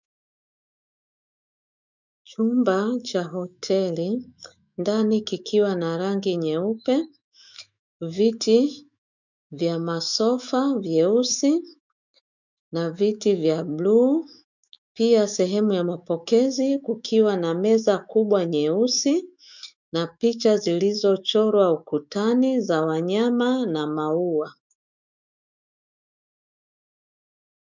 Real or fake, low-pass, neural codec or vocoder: fake; 7.2 kHz; autoencoder, 48 kHz, 128 numbers a frame, DAC-VAE, trained on Japanese speech